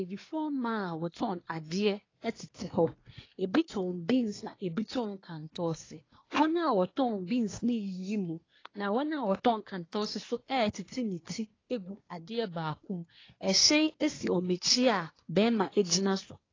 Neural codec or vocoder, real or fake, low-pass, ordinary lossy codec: codec, 24 kHz, 1 kbps, SNAC; fake; 7.2 kHz; AAC, 32 kbps